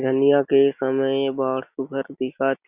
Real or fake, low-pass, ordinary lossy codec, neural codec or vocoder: real; 3.6 kHz; Opus, 64 kbps; none